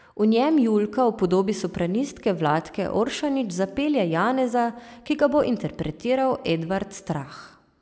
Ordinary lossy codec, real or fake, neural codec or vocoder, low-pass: none; real; none; none